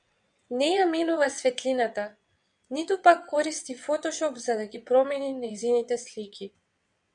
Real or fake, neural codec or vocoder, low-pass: fake; vocoder, 22.05 kHz, 80 mel bands, WaveNeXt; 9.9 kHz